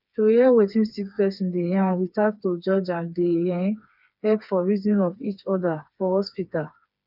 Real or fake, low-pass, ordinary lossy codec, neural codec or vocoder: fake; 5.4 kHz; none; codec, 16 kHz, 4 kbps, FreqCodec, smaller model